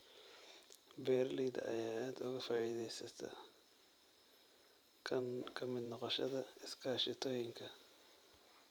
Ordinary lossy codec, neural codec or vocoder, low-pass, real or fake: none; vocoder, 44.1 kHz, 128 mel bands every 512 samples, BigVGAN v2; none; fake